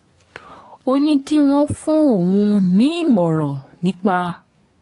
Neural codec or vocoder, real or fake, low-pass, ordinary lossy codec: codec, 24 kHz, 1 kbps, SNAC; fake; 10.8 kHz; AAC, 48 kbps